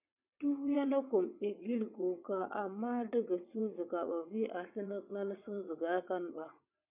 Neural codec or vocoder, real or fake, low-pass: vocoder, 22.05 kHz, 80 mel bands, WaveNeXt; fake; 3.6 kHz